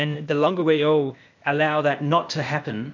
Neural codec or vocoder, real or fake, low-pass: codec, 16 kHz, 0.8 kbps, ZipCodec; fake; 7.2 kHz